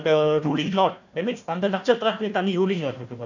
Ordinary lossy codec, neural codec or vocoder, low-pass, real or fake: none; codec, 16 kHz, 1 kbps, FunCodec, trained on Chinese and English, 50 frames a second; 7.2 kHz; fake